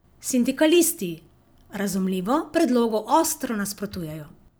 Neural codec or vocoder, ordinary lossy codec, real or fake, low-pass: vocoder, 44.1 kHz, 128 mel bands every 256 samples, BigVGAN v2; none; fake; none